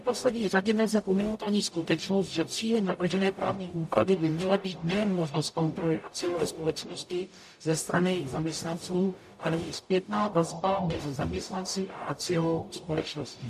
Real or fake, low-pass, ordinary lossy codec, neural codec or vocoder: fake; 14.4 kHz; AAC, 96 kbps; codec, 44.1 kHz, 0.9 kbps, DAC